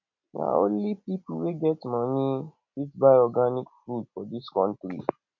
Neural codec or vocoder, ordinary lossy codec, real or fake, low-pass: none; none; real; 7.2 kHz